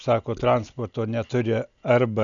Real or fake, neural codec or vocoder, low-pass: real; none; 7.2 kHz